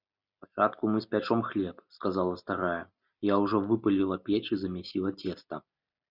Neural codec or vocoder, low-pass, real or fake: none; 5.4 kHz; real